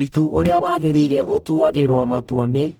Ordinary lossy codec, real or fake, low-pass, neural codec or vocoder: none; fake; 19.8 kHz; codec, 44.1 kHz, 0.9 kbps, DAC